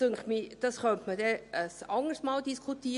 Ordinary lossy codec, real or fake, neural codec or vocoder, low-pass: MP3, 48 kbps; real; none; 10.8 kHz